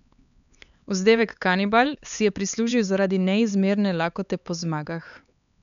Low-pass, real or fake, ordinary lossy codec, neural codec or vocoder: 7.2 kHz; fake; none; codec, 16 kHz, 4 kbps, X-Codec, HuBERT features, trained on LibriSpeech